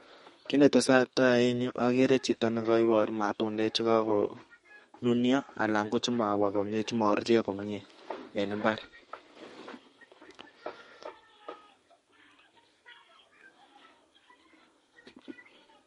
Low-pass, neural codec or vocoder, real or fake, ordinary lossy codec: 14.4 kHz; codec, 32 kHz, 1.9 kbps, SNAC; fake; MP3, 48 kbps